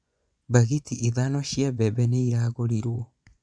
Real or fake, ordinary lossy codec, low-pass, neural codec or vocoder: fake; none; 9.9 kHz; vocoder, 24 kHz, 100 mel bands, Vocos